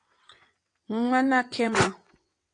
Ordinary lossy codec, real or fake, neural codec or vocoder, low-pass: AAC, 64 kbps; fake; vocoder, 22.05 kHz, 80 mel bands, WaveNeXt; 9.9 kHz